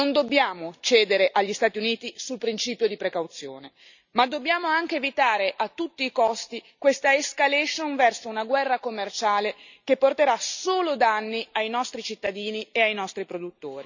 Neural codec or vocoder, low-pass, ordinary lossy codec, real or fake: none; 7.2 kHz; none; real